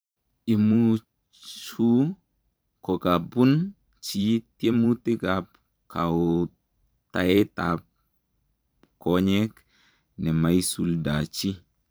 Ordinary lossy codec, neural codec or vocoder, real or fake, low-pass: none; vocoder, 44.1 kHz, 128 mel bands every 512 samples, BigVGAN v2; fake; none